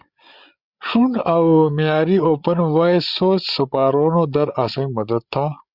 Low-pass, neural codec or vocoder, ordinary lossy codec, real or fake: 5.4 kHz; codec, 16 kHz, 8 kbps, FreqCodec, larger model; Opus, 64 kbps; fake